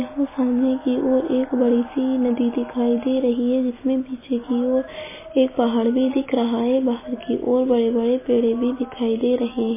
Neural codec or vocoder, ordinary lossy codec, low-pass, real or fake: none; MP3, 16 kbps; 3.6 kHz; real